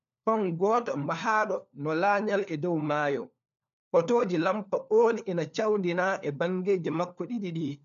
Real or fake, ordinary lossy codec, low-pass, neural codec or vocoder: fake; none; 7.2 kHz; codec, 16 kHz, 4 kbps, FunCodec, trained on LibriTTS, 50 frames a second